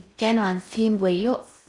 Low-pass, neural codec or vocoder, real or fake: 10.8 kHz; codec, 16 kHz in and 24 kHz out, 0.6 kbps, FocalCodec, streaming, 4096 codes; fake